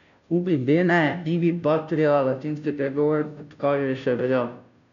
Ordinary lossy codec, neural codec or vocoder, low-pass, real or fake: none; codec, 16 kHz, 0.5 kbps, FunCodec, trained on Chinese and English, 25 frames a second; 7.2 kHz; fake